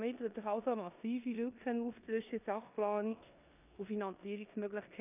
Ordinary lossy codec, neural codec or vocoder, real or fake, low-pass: none; codec, 16 kHz in and 24 kHz out, 0.9 kbps, LongCat-Audio-Codec, four codebook decoder; fake; 3.6 kHz